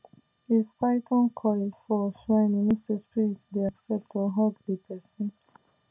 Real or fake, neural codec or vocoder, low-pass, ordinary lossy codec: real; none; 3.6 kHz; none